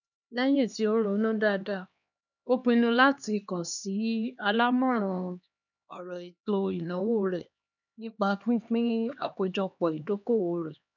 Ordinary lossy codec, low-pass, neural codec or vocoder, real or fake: none; 7.2 kHz; codec, 16 kHz, 2 kbps, X-Codec, HuBERT features, trained on LibriSpeech; fake